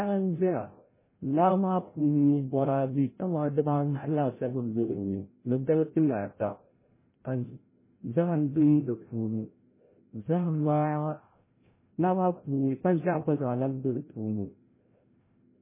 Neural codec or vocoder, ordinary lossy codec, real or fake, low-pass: codec, 16 kHz, 0.5 kbps, FreqCodec, larger model; MP3, 16 kbps; fake; 3.6 kHz